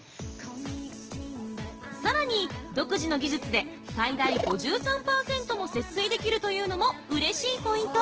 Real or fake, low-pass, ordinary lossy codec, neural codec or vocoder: real; 7.2 kHz; Opus, 16 kbps; none